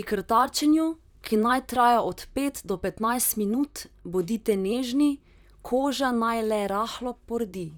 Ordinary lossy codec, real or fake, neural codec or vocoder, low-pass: none; fake; vocoder, 44.1 kHz, 128 mel bands, Pupu-Vocoder; none